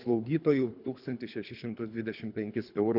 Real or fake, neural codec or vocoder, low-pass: fake; codec, 24 kHz, 3 kbps, HILCodec; 5.4 kHz